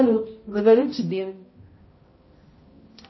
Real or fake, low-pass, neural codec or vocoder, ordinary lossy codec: fake; 7.2 kHz; codec, 16 kHz, 0.5 kbps, X-Codec, HuBERT features, trained on balanced general audio; MP3, 24 kbps